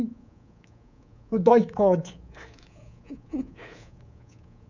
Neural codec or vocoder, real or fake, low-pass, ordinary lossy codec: codec, 24 kHz, 3.1 kbps, DualCodec; fake; 7.2 kHz; none